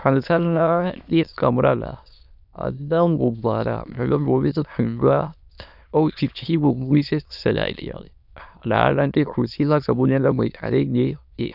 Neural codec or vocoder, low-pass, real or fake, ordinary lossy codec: autoencoder, 22.05 kHz, a latent of 192 numbers a frame, VITS, trained on many speakers; 5.4 kHz; fake; none